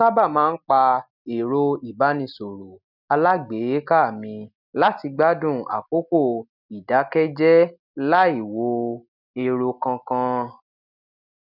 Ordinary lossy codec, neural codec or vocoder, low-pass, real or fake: none; none; 5.4 kHz; real